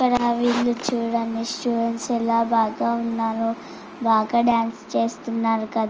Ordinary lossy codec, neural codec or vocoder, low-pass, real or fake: Opus, 24 kbps; none; 7.2 kHz; real